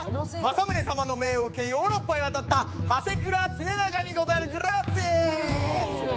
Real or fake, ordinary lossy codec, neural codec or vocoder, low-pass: fake; none; codec, 16 kHz, 4 kbps, X-Codec, HuBERT features, trained on general audio; none